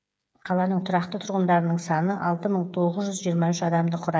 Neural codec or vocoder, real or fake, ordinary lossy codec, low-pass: codec, 16 kHz, 8 kbps, FreqCodec, smaller model; fake; none; none